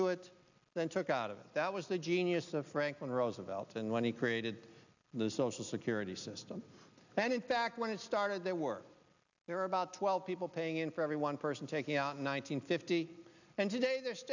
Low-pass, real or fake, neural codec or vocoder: 7.2 kHz; real; none